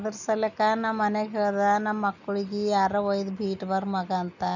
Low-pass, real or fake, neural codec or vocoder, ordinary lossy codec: 7.2 kHz; real; none; none